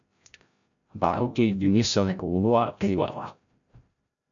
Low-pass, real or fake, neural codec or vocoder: 7.2 kHz; fake; codec, 16 kHz, 0.5 kbps, FreqCodec, larger model